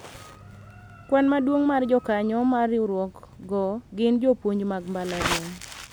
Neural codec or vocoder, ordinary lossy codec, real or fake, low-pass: none; none; real; none